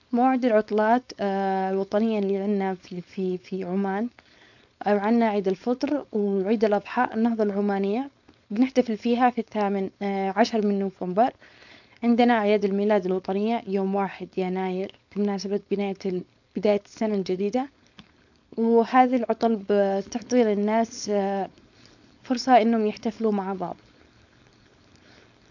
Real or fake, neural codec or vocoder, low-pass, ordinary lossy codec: fake; codec, 16 kHz, 4.8 kbps, FACodec; 7.2 kHz; none